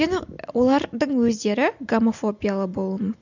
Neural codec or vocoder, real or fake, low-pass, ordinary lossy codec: none; real; 7.2 kHz; none